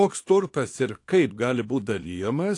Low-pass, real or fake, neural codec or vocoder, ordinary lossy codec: 10.8 kHz; fake; codec, 24 kHz, 0.9 kbps, WavTokenizer, small release; AAC, 48 kbps